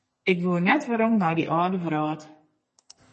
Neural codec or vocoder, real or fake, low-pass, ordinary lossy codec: codec, 44.1 kHz, 2.6 kbps, SNAC; fake; 10.8 kHz; MP3, 32 kbps